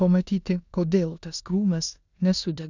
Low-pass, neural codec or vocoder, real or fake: 7.2 kHz; codec, 16 kHz in and 24 kHz out, 0.9 kbps, LongCat-Audio-Codec, four codebook decoder; fake